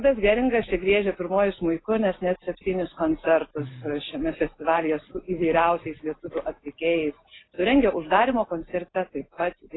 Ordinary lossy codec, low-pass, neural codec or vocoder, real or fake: AAC, 16 kbps; 7.2 kHz; none; real